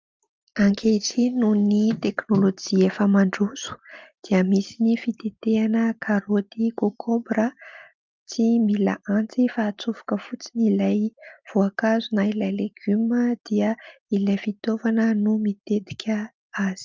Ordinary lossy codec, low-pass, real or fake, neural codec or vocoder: Opus, 24 kbps; 7.2 kHz; real; none